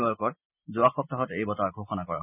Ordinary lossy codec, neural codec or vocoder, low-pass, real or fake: none; none; 3.6 kHz; real